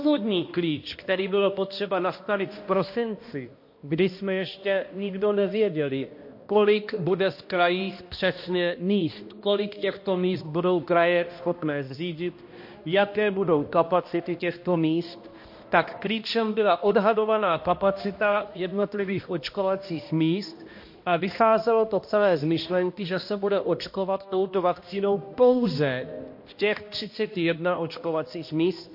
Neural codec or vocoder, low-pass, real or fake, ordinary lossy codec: codec, 16 kHz, 1 kbps, X-Codec, HuBERT features, trained on balanced general audio; 5.4 kHz; fake; MP3, 32 kbps